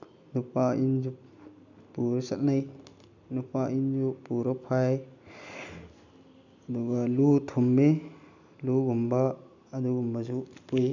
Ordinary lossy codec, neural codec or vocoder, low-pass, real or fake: none; none; 7.2 kHz; real